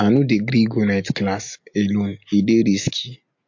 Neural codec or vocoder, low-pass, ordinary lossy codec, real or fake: none; 7.2 kHz; MP3, 48 kbps; real